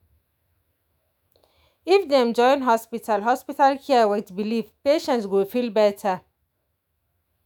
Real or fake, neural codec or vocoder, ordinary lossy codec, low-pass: fake; autoencoder, 48 kHz, 128 numbers a frame, DAC-VAE, trained on Japanese speech; none; 19.8 kHz